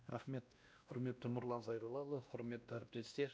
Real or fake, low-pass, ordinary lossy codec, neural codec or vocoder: fake; none; none; codec, 16 kHz, 1 kbps, X-Codec, WavLM features, trained on Multilingual LibriSpeech